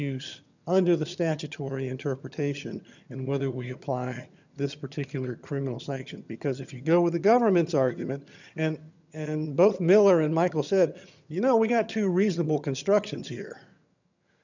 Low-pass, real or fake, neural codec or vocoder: 7.2 kHz; fake; vocoder, 22.05 kHz, 80 mel bands, HiFi-GAN